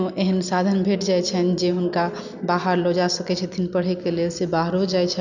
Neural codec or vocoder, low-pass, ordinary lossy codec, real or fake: none; 7.2 kHz; none; real